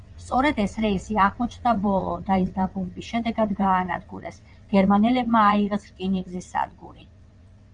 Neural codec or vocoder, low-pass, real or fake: vocoder, 22.05 kHz, 80 mel bands, WaveNeXt; 9.9 kHz; fake